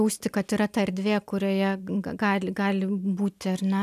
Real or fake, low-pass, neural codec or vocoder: real; 14.4 kHz; none